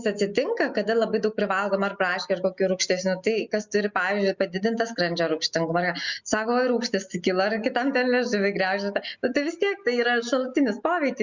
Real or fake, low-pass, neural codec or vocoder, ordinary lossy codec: real; 7.2 kHz; none; Opus, 64 kbps